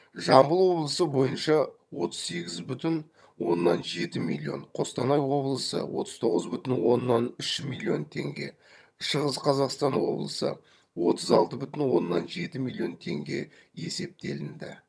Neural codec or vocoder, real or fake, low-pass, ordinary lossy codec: vocoder, 22.05 kHz, 80 mel bands, HiFi-GAN; fake; none; none